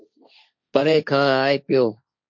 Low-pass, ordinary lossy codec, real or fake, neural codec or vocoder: 7.2 kHz; MP3, 48 kbps; fake; codec, 16 kHz, 1.1 kbps, Voila-Tokenizer